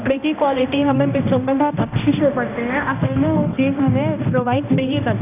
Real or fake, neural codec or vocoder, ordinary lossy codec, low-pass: fake; codec, 16 kHz, 1 kbps, X-Codec, HuBERT features, trained on balanced general audio; none; 3.6 kHz